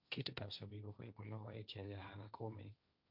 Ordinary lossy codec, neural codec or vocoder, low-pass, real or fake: none; codec, 16 kHz, 1.1 kbps, Voila-Tokenizer; 5.4 kHz; fake